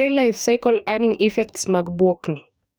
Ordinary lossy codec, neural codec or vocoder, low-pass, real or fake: none; codec, 44.1 kHz, 2.6 kbps, DAC; none; fake